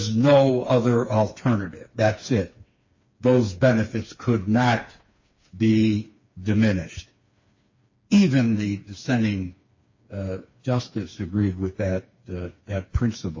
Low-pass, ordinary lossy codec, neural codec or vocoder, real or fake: 7.2 kHz; MP3, 32 kbps; codec, 16 kHz, 4 kbps, FreqCodec, smaller model; fake